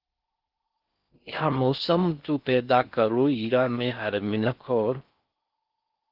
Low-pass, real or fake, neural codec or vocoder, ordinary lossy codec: 5.4 kHz; fake; codec, 16 kHz in and 24 kHz out, 0.6 kbps, FocalCodec, streaming, 4096 codes; Opus, 32 kbps